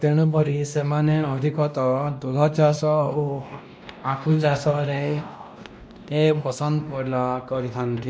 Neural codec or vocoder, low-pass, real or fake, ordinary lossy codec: codec, 16 kHz, 1 kbps, X-Codec, WavLM features, trained on Multilingual LibriSpeech; none; fake; none